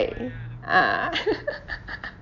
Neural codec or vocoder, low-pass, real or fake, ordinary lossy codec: none; 7.2 kHz; real; none